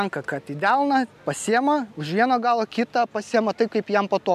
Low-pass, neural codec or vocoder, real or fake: 14.4 kHz; none; real